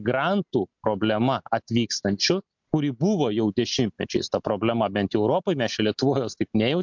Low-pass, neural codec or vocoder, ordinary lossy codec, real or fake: 7.2 kHz; none; MP3, 64 kbps; real